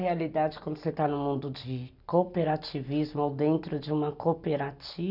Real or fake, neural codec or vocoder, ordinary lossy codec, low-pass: real; none; none; 5.4 kHz